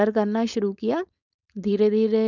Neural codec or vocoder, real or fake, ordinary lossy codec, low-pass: codec, 16 kHz, 4.8 kbps, FACodec; fake; none; 7.2 kHz